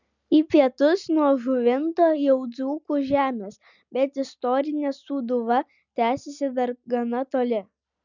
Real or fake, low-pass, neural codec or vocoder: real; 7.2 kHz; none